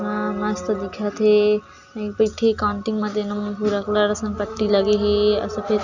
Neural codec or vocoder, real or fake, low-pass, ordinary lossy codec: none; real; 7.2 kHz; none